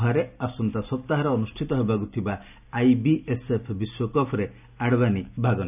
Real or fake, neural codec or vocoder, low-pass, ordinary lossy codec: real; none; 3.6 kHz; none